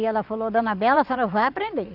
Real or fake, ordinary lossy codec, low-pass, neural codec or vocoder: fake; none; 5.4 kHz; vocoder, 22.05 kHz, 80 mel bands, WaveNeXt